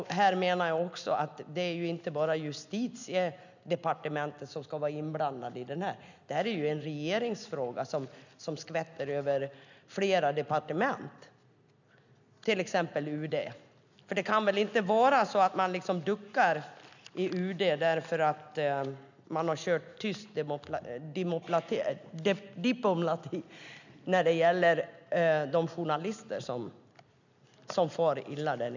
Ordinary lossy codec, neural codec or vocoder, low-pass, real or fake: none; none; 7.2 kHz; real